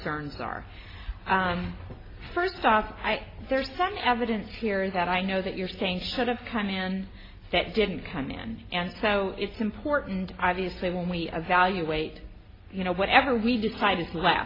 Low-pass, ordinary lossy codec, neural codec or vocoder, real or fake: 5.4 kHz; AAC, 24 kbps; none; real